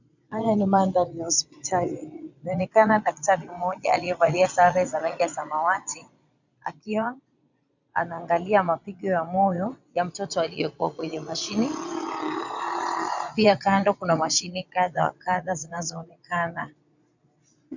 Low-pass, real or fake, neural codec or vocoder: 7.2 kHz; fake; vocoder, 22.05 kHz, 80 mel bands, Vocos